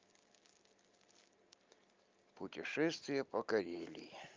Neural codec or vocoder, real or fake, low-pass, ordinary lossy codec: none; real; 7.2 kHz; Opus, 24 kbps